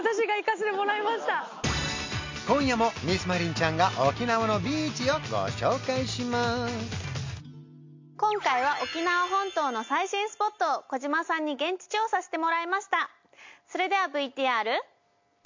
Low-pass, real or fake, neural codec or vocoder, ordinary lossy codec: 7.2 kHz; real; none; MP3, 48 kbps